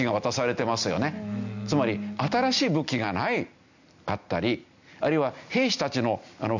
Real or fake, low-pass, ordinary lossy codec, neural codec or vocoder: real; 7.2 kHz; none; none